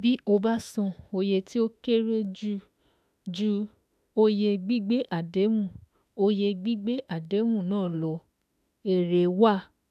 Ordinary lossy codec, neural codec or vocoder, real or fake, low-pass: none; autoencoder, 48 kHz, 32 numbers a frame, DAC-VAE, trained on Japanese speech; fake; 14.4 kHz